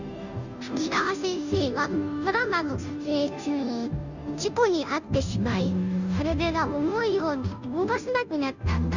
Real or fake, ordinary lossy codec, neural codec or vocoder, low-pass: fake; none; codec, 16 kHz, 0.5 kbps, FunCodec, trained on Chinese and English, 25 frames a second; 7.2 kHz